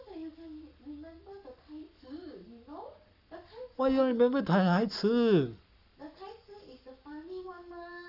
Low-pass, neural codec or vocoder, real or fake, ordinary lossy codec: 5.4 kHz; codec, 44.1 kHz, 7.8 kbps, Pupu-Codec; fake; none